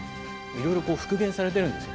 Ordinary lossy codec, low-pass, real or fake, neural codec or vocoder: none; none; real; none